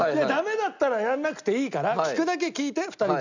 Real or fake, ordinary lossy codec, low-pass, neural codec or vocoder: real; none; 7.2 kHz; none